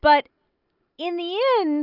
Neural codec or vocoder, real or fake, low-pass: none; real; 5.4 kHz